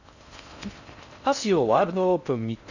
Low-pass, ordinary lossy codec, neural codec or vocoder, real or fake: 7.2 kHz; none; codec, 16 kHz in and 24 kHz out, 0.6 kbps, FocalCodec, streaming, 4096 codes; fake